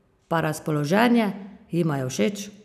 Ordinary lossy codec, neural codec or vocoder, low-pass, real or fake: none; none; 14.4 kHz; real